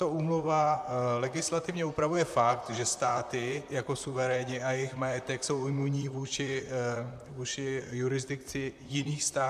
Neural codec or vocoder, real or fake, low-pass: vocoder, 44.1 kHz, 128 mel bands, Pupu-Vocoder; fake; 14.4 kHz